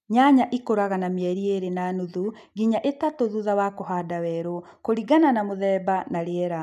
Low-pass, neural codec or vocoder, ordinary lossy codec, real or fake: 14.4 kHz; none; none; real